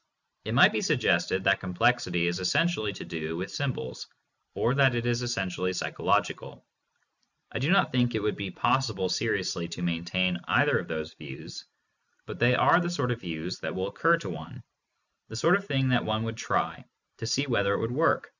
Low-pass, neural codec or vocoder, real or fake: 7.2 kHz; none; real